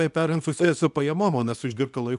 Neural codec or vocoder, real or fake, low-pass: codec, 24 kHz, 0.9 kbps, WavTokenizer, small release; fake; 10.8 kHz